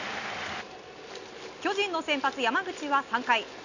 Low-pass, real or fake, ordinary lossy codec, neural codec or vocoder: 7.2 kHz; real; none; none